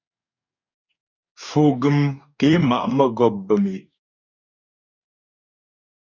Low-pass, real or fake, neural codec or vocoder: 7.2 kHz; fake; codec, 44.1 kHz, 2.6 kbps, DAC